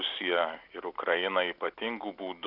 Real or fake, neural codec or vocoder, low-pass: real; none; 5.4 kHz